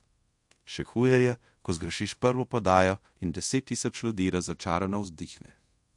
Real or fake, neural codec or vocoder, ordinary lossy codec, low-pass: fake; codec, 24 kHz, 0.5 kbps, DualCodec; MP3, 48 kbps; 10.8 kHz